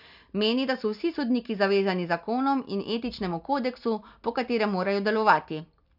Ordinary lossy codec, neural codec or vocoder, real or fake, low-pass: none; none; real; 5.4 kHz